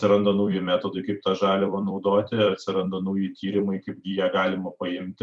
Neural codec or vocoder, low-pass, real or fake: vocoder, 44.1 kHz, 128 mel bands every 256 samples, BigVGAN v2; 10.8 kHz; fake